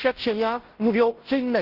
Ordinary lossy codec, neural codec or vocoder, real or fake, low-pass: Opus, 16 kbps; codec, 16 kHz, 0.5 kbps, FunCodec, trained on Chinese and English, 25 frames a second; fake; 5.4 kHz